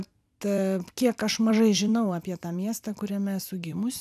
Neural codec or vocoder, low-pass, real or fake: vocoder, 44.1 kHz, 128 mel bands every 256 samples, BigVGAN v2; 14.4 kHz; fake